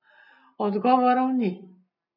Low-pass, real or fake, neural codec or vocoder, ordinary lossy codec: 5.4 kHz; real; none; none